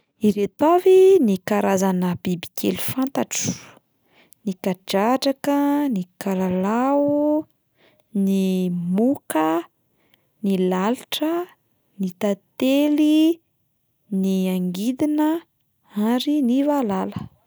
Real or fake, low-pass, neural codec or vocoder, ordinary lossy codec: real; none; none; none